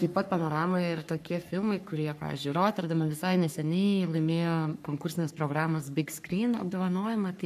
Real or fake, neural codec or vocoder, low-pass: fake; codec, 44.1 kHz, 3.4 kbps, Pupu-Codec; 14.4 kHz